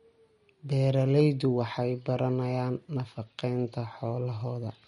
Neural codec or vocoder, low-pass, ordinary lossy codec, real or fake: none; 19.8 kHz; MP3, 48 kbps; real